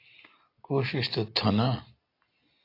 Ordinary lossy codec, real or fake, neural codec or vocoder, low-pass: AAC, 32 kbps; real; none; 5.4 kHz